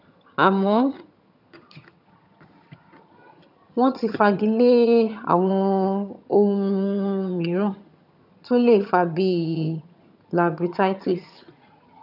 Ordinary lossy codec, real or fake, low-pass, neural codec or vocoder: none; fake; 5.4 kHz; vocoder, 22.05 kHz, 80 mel bands, HiFi-GAN